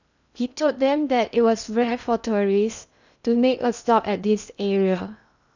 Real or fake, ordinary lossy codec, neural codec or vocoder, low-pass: fake; none; codec, 16 kHz in and 24 kHz out, 0.8 kbps, FocalCodec, streaming, 65536 codes; 7.2 kHz